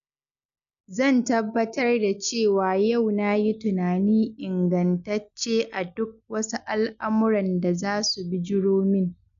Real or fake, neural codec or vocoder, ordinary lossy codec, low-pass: real; none; none; 7.2 kHz